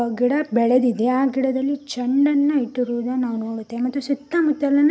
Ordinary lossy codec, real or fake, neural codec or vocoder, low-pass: none; real; none; none